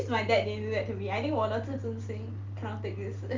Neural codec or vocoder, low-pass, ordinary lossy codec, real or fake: none; 7.2 kHz; Opus, 24 kbps; real